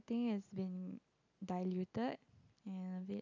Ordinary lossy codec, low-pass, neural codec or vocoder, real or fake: none; 7.2 kHz; none; real